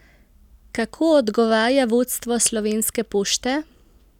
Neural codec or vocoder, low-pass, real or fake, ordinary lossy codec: none; 19.8 kHz; real; none